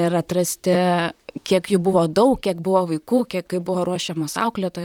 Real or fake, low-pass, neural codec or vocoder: fake; 19.8 kHz; vocoder, 44.1 kHz, 128 mel bands, Pupu-Vocoder